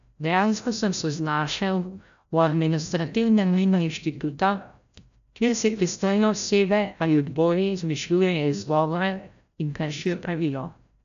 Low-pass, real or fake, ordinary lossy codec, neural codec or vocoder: 7.2 kHz; fake; AAC, 96 kbps; codec, 16 kHz, 0.5 kbps, FreqCodec, larger model